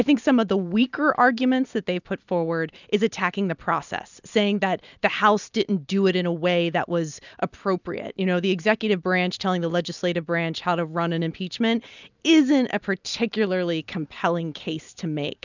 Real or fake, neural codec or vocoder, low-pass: real; none; 7.2 kHz